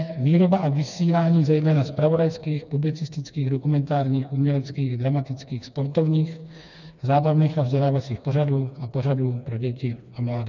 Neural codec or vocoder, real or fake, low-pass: codec, 16 kHz, 2 kbps, FreqCodec, smaller model; fake; 7.2 kHz